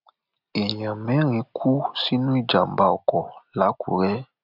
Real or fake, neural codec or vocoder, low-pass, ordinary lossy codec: real; none; 5.4 kHz; none